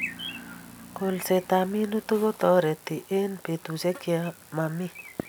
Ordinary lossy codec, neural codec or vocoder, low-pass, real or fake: none; none; none; real